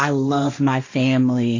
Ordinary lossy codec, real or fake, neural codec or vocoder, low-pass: AAC, 48 kbps; fake; codec, 16 kHz, 1.1 kbps, Voila-Tokenizer; 7.2 kHz